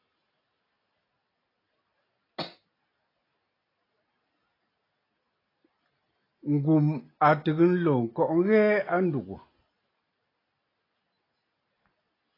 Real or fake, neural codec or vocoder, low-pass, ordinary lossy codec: real; none; 5.4 kHz; AAC, 24 kbps